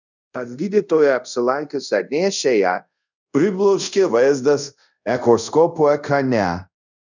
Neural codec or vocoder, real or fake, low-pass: codec, 24 kHz, 0.5 kbps, DualCodec; fake; 7.2 kHz